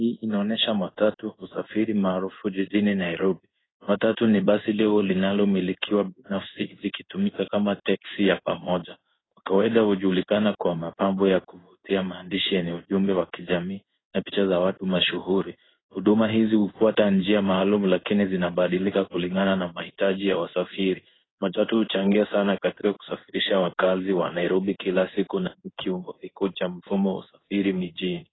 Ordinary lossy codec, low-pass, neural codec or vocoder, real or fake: AAC, 16 kbps; 7.2 kHz; codec, 16 kHz in and 24 kHz out, 1 kbps, XY-Tokenizer; fake